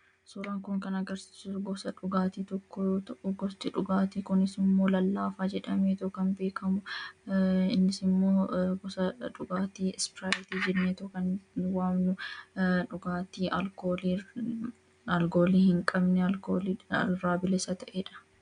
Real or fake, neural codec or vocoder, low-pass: real; none; 9.9 kHz